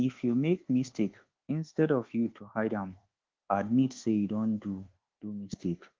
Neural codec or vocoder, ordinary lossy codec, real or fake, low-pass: codec, 24 kHz, 1.2 kbps, DualCodec; Opus, 16 kbps; fake; 7.2 kHz